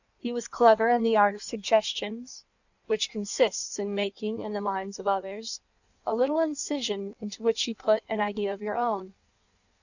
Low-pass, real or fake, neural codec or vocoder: 7.2 kHz; fake; codec, 16 kHz in and 24 kHz out, 1.1 kbps, FireRedTTS-2 codec